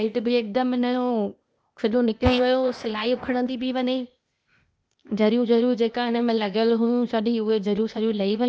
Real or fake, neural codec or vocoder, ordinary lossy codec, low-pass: fake; codec, 16 kHz, 0.8 kbps, ZipCodec; none; none